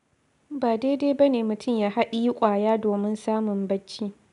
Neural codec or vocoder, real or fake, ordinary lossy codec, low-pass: none; real; none; 10.8 kHz